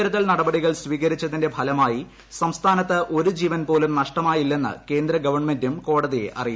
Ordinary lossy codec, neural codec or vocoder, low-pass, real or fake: none; none; none; real